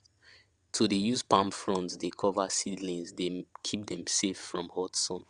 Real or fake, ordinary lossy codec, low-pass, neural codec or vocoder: fake; Opus, 64 kbps; 9.9 kHz; vocoder, 22.05 kHz, 80 mel bands, WaveNeXt